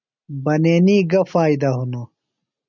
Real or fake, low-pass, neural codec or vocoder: real; 7.2 kHz; none